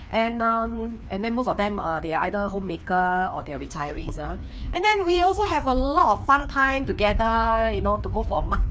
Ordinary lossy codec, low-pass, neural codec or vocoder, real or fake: none; none; codec, 16 kHz, 2 kbps, FreqCodec, larger model; fake